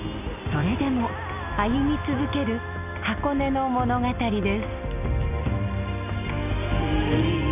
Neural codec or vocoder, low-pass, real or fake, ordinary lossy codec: none; 3.6 kHz; real; none